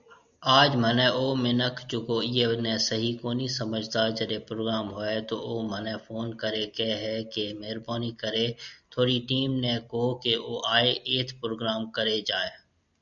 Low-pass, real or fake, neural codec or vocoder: 7.2 kHz; real; none